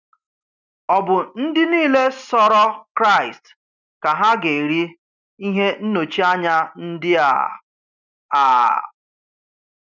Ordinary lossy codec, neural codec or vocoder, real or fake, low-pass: none; none; real; 7.2 kHz